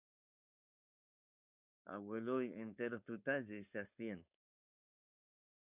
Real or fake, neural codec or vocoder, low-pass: fake; codec, 16 kHz, 4.8 kbps, FACodec; 3.6 kHz